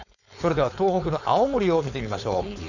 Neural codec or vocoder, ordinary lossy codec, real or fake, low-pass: codec, 16 kHz, 4.8 kbps, FACodec; none; fake; 7.2 kHz